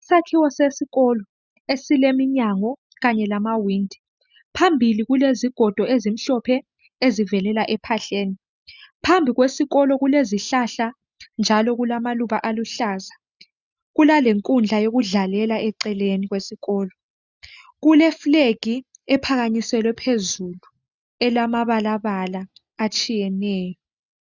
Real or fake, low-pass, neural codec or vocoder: real; 7.2 kHz; none